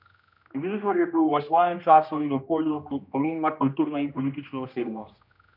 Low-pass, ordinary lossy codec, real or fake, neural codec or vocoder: 5.4 kHz; none; fake; codec, 16 kHz, 1 kbps, X-Codec, HuBERT features, trained on general audio